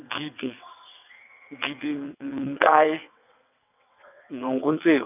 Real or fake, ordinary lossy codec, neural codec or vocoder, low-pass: fake; none; vocoder, 22.05 kHz, 80 mel bands, WaveNeXt; 3.6 kHz